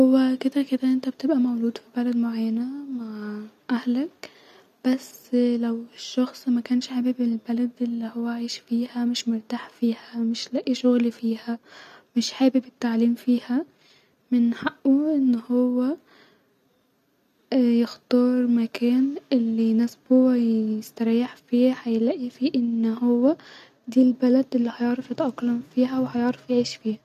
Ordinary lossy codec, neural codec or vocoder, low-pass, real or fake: none; none; 14.4 kHz; real